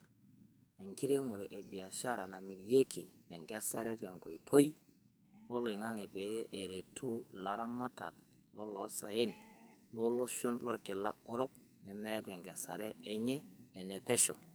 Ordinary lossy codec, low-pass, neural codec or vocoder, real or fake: none; none; codec, 44.1 kHz, 2.6 kbps, SNAC; fake